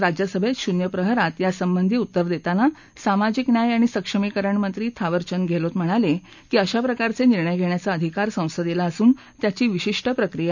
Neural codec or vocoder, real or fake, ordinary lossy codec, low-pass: none; real; none; 7.2 kHz